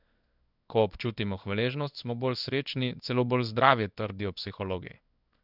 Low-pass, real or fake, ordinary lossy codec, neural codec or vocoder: 5.4 kHz; fake; none; codec, 16 kHz in and 24 kHz out, 1 kbps, XY-Tokenizer